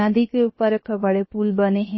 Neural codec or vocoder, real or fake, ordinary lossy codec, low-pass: codec, 16 kHz, 0.7 kbps, FocalCodec; fake; MP3, 24 kbps; 7.2 kHz